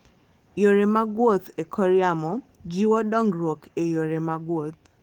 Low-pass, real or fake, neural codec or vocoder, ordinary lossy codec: 19.8 kHz; fake; codec, 44.1 kHz, 7.8 kbps, DAC; Opus, 24 kbps